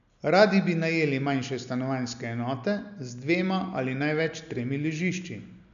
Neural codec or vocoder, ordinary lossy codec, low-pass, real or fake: none; none; 7.2 kHz; real